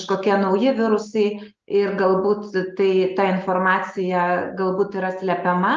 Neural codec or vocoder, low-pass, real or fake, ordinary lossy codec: none; 7.2 kHz; real; Opus, 32 kbps